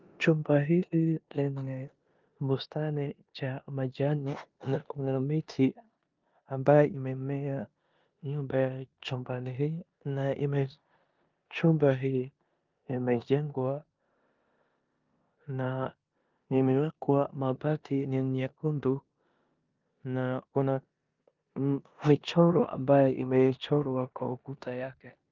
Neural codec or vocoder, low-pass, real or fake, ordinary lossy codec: codec, 16 kHz in and 24 kHz out, 0.9 kbps, LongCat-Audio-Codec, four codebook decoder; 7.2 kHz; fake; Opus, 24 kbps